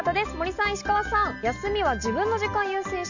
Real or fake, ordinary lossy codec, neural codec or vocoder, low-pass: real; none; none; 7.2 kHz